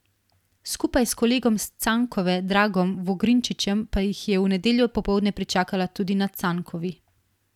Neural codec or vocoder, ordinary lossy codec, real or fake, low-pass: vocoder, 44.1 kHz, 128 mel bands every 256 samples, BigVGAN v2; none; fake; 19.8 kHz